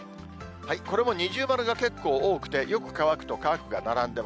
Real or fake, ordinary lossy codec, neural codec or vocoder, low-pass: real; none; none; none